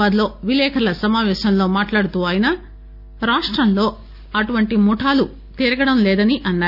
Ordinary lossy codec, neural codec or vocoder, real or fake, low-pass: none; none; real; 5.4 kHz